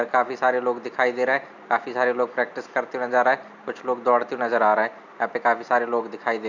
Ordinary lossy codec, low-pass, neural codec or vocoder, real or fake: none; 7.2 kHz; none; real